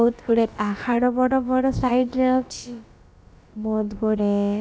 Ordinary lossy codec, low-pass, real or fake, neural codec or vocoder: none; none; fake; codec, 16 kHz, about 1 kbps, DyCAST, with the encoder's durations